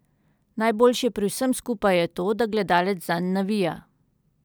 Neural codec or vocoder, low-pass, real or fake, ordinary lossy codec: none; none; real; none